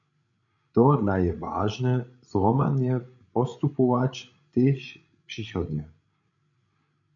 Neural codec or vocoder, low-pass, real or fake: codec, 16 kHz, 16 kbps, FreqCodec, larger model; 7.2 kHz; fake